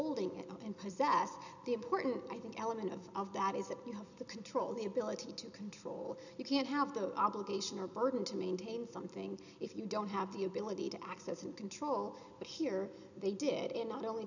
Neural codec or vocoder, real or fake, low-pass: none; real; 7.2 kHz